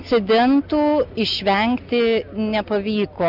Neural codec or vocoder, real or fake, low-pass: none; real; 5.4 kHz